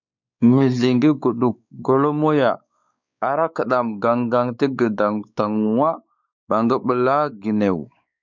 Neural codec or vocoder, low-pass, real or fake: codec, 16 kHz, 4 kbps, X-Codec, WavLM features, trained on Multilingual LibriSpeech; 7.2 kHz; fake